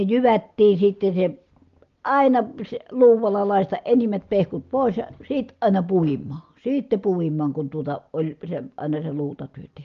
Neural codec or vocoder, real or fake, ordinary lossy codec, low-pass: none; real; Opus, 32 kbps; 7.2 kHz